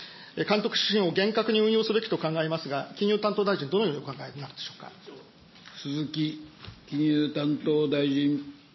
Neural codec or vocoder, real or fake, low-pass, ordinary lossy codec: none; real; 7.2 kHz; MP3, 24 kbps